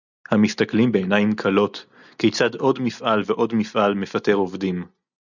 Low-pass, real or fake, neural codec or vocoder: 7.2 kHz; real; none